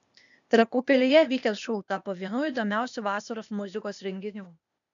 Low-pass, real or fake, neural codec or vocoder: 7.2 kHz; fake; codec, 16 kHz, 0.8 kbps, ZipCodec